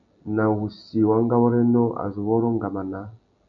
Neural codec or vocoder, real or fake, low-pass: none; real; 7.2 kHz